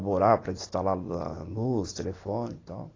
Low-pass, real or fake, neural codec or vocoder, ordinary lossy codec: 7.2 kHz; real; none; AAC, 32 kbps